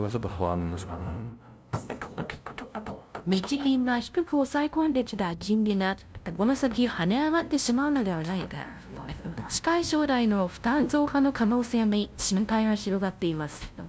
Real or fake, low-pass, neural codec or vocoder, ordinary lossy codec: fake; none; codec, 16 kHz, 0.5 kbps, FunCodec, trained on LibriTTS, 25 frames a second; none